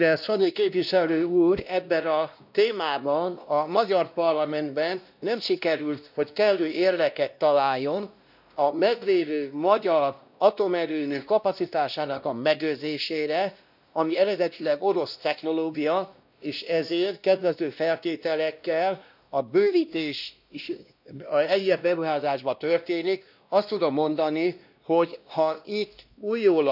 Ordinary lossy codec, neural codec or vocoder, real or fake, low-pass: none; codec, 16 kHz, 1 kbps, X-Codec, WavLM features, trained on Multilingual LibriSpeech; fake; 5.4 kHz